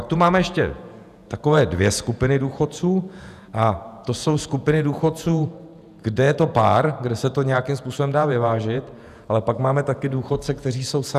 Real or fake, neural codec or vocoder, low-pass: fake; vocoder, 48 kHz, 128 mel bands, Vocos; 14.4 kHz